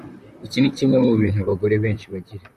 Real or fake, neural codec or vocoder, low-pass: fake; vocoder, 44.1 kHz, 128 mel bands, Pupu-Vocoder; 14.4 kHz